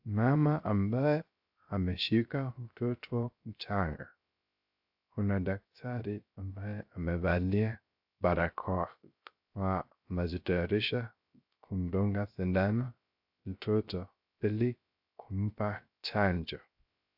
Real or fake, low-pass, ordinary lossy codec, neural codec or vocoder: fake; 5.4 kHz; AAC, 48 kbps; codec, 16 kHz, 0.3 kbps, FocalCodec